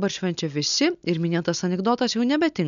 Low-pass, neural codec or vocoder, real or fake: 7.2 kHz; none; real